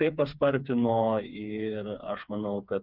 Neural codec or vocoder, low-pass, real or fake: codec, 16 kHz, 4 kbps, FreqCodec, smaller model; 5.4 kHz; fake